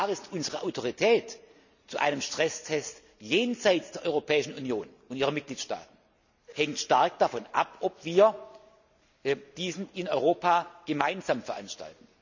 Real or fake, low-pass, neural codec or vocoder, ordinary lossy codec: real; 7.2 kHz; none; none